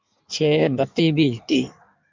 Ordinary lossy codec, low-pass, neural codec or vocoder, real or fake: MP3, 64 kbps; 7.2 kHz; codec, 16 kHz in and 24 kHz out, 1.1 kbps, FireRedTTS-2 codec; fake